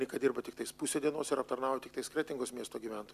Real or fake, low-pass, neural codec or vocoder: real; 14.4 kHz; none